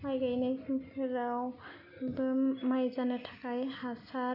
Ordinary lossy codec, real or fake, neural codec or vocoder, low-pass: none; real; none; 5.4 kHz